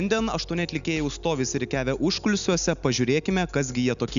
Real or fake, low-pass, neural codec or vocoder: real; 7.2 kHz; none